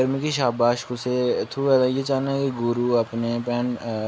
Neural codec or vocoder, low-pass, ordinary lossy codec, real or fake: none; none; none; real